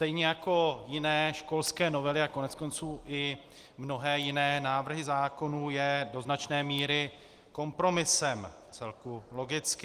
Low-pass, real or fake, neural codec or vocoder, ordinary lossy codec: 14.4 kHz; real; none; Opus, 24 kbps